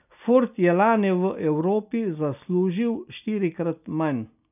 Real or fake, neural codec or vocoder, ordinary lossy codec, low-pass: real; none; none; 3.6 kHz